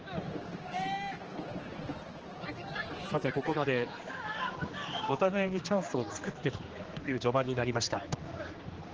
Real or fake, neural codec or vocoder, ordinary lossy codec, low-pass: fake; codec, 16 kHz, 2 kbps, X-Codec, HuBERT features, trained on general audio; Opus, 16 kbps; 7.2 kHz